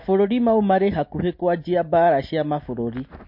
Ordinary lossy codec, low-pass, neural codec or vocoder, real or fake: MP3, 32 kbps; 5.4 kHz; none; real